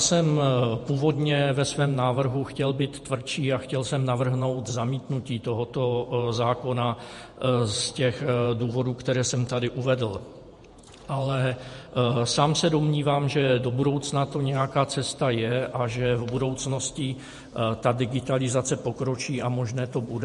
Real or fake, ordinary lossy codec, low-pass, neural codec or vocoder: fake; MP3, 48 kbps; 14.4 kHz; vocoder, 48 kHz, 128 mel bands, Vocos